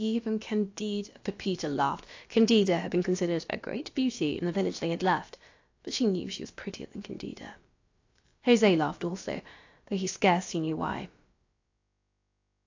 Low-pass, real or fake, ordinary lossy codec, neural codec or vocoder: 7.2 kHz; fake; AAC, 48 kbps; codec, 16 kHz, about 1 kbps, DyCAST, with the encoder's durations